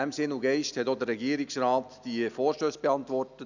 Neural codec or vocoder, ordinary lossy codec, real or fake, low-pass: none; none; real; 7.2 kHz